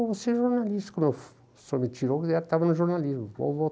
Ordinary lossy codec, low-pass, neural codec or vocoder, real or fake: none; none; none; real